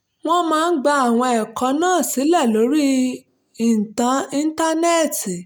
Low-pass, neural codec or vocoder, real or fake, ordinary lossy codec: none; none; real; none